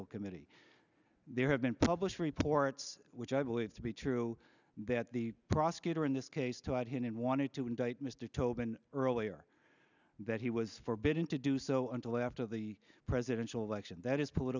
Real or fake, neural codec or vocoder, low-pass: real; none; 7.2 kHz